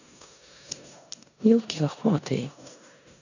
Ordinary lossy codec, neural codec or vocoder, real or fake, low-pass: none; codec, 16 kHz in and 24 kHz out, 0.9 kbps, LongCat-Audio-Codec, four codebook decoder; fake; 7.2 kHz